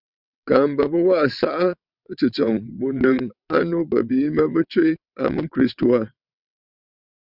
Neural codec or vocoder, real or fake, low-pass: vocoder, 22.05 kHz, 80 mel bands, WaveNeXt; fake; 5.4 kHz